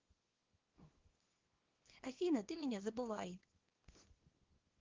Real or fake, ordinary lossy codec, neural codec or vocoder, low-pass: fake; Opus, 16 kbps; codec, 24 kHz, 0.9 kbps, WavTokenizer, small release; 7.2 kHz